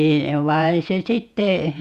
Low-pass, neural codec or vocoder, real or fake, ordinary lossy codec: 14.4 kHz; vocoder, 48 kHz, 128 mel bands, Vocos; fake; Opus, 64 kbps